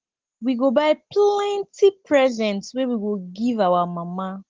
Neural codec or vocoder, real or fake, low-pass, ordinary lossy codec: none; real; 7.2 kHz; Opus, 16 kbps